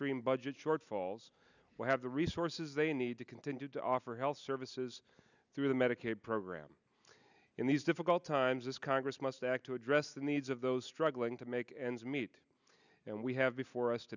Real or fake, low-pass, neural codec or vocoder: real; 7.2 kHz; none